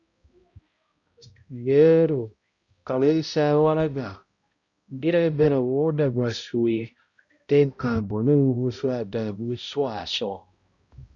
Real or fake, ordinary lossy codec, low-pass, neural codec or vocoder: fake; Opus, 64 kbps; 7.2 kHz; codec, 16 kHz, 0.5 kbps, X-Codec, HuBERT features, trained on balanced general audio